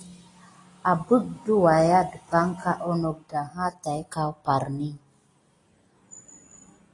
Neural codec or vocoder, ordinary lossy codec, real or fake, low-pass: none; AAC, 32 kbps; real; 10.8 kHz